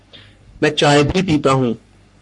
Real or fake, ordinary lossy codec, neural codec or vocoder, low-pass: fake; MP3, 48 kbps; codec, 44.1 kHz, 3.4 kbps, Pupu-Codec; 10.8 kHz